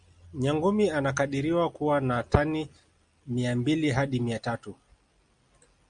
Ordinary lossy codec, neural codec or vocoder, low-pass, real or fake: Opus, 64 kbps; none; 9.9 kHz; real